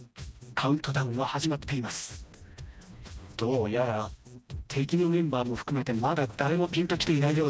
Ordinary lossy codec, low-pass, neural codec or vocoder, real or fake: none; none; codec, 16 kHz, 1 kbps, FreqCodec, smaller model; fake